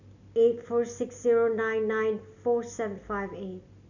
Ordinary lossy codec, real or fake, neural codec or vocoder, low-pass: none; real; none; 7.2 kHz